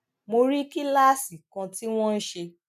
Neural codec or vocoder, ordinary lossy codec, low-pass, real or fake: none; none; 14.4 kHz; real